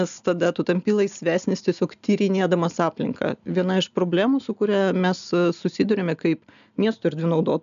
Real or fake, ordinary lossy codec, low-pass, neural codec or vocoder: real; MP3, 96 kbps; 7.2 kHz; none